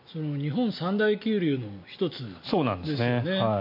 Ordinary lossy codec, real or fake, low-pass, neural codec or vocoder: MP3, 32 kbps; real; 5.4 kHz; none